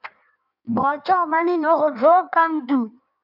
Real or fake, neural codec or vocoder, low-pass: fake; codec, 16 kHz in and 24 kHz out, 1.1 kbps, FireRedTTS-2 codec; 5.4 kHz